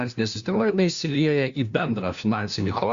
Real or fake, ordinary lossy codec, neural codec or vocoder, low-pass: fake; Opus, 64 kbps; codec, 16 kHz, 1 kbps, FunCodec, trained on LibriTTS, 50 frames a second; 7.2 kHz